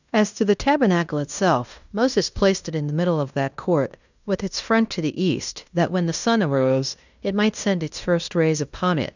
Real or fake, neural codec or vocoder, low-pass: fake; codec, 16 kHz in and 24 kHz out, 0.9 kbps, LongCat-Audio-Codec, fine tuned four codebook decoder; 7.2 kHz